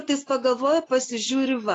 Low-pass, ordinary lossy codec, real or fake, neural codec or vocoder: 10.8 kHz; AAC, 32 kbps; real; none